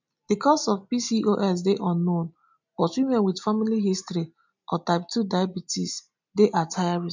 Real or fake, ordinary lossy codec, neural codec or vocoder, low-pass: real; MP3, 64 kbps; none; 7.2 kHz